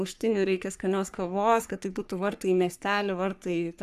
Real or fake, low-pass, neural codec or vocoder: fake; 14.4 kHz; codec, 44.1 kHz, 3.4 kbps, Pupu-Codec